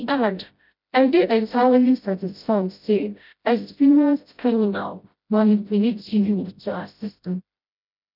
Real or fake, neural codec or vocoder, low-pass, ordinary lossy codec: fake; codec, 16 kHz, 0.5 kbps, FreqCodec, smaller model; 5.4 kHz; none